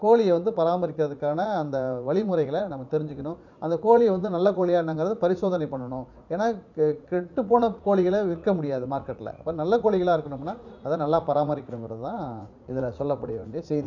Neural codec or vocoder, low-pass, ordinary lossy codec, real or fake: vocoder, 44.1 kHz, 80 mel bands, Vocos; 7.2 kHz; none; fake